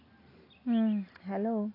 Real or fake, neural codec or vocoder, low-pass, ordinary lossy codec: real; none; 5.4 kHz; AAC, 24 kbps